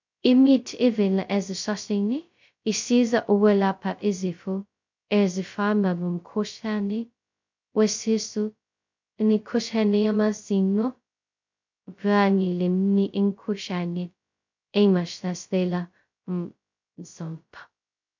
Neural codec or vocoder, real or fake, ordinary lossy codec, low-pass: codec, 16 kHz, 0.2 kbps, FocalCodec; fake; MP3, 64 kbps; 7.2 kHz